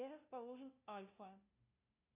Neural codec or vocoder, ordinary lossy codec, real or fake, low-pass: codec, 16 kHz, 0.5 kbps, FunCodec, trained on LibriTTS, 25 frames a second; AAC, 32 kbps; fake; 3.6 kHz